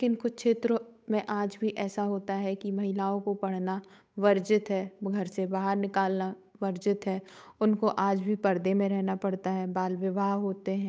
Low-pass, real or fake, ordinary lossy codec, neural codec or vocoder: none; fake; none; codec, 16 kHz, 8 kbps, FunCodec, trained on Chinese and English, 25 frames a second